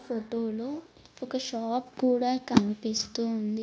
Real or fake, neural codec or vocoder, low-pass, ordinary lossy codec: fake; codec, 16 kHz, 0.9 kbps, LongCat-Audio-Codec; none; none